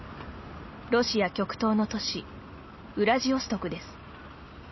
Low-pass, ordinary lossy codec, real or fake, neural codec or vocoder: 7.2 kHz; MP3, 24 kbps; real; none